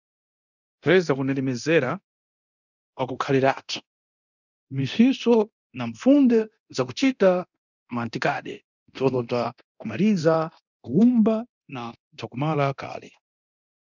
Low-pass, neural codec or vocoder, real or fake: 7.2 kHz; codec, 24 kHz, 0.9 kbps, DualCodec; fake